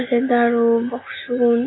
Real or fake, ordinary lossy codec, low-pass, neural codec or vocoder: real; AAC, 16 kbps; 7.2 kHz; none